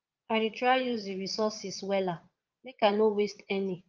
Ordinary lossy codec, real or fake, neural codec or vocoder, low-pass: Opus, 32 kbps; fake; vocoder, 22.05 kHz, 80 mel bands, Vocos; 7.2 kHz